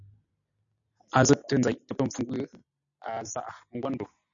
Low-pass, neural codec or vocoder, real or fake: 7.2 kHz; none; real